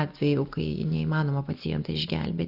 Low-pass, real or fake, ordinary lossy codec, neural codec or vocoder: 5.4 kHz; real; Opus, 64 kbps; none